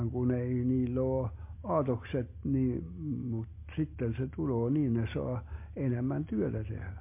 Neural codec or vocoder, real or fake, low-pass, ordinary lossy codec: none; real; 3.6 kHz; MP3, 24 kbps